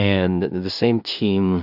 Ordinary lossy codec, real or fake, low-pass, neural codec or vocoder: AAC, 48 kbps; fake; 5.4 kHz; codec, 16 kHz in and 24 kHz out, 0.4 kbps, LongCat-Audio-Codec, two codebook decoder